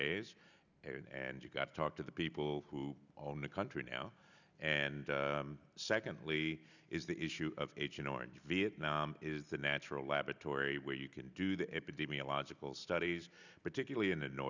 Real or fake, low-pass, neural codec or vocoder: real; 7.2 kHz; none